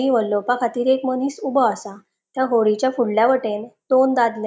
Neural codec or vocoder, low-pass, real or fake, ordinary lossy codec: none; none; real; none